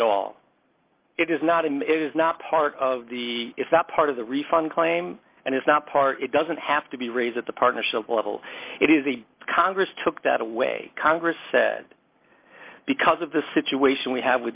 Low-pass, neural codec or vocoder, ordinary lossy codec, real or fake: 3.6 kHz; none; Opus, 24 kbps; real